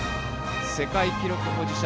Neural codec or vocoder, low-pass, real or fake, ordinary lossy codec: none; none; real; none